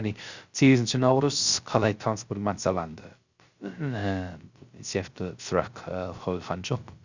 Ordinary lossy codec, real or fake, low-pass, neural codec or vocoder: Opus, 64 kbps; fake; 7.2 kHz; codec, 16 kHz, 0.3 kbps, FocalCodec